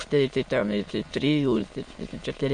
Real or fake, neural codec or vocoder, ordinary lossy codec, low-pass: fake; autoencoder, 22.05 kHz, a latent of 192 numbers a frame, VITS, trained on many speakers; MP3, 48 kbps; 9.9 kHz